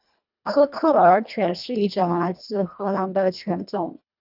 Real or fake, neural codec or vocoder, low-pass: fake; codec, 24 kHz, 1.5 kbps, HILCodec; 5.4 kHz